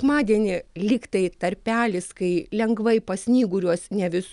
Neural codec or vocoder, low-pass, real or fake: none; 10.8 kHz; real